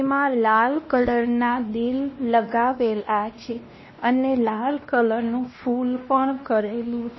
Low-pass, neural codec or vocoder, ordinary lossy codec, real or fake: 7.2 kHz; codec, 16 kHz, 1 kbps, X-Codec, WavLM features, trained on Multilingual LibriSpeech; MP3, 24 kbps; fake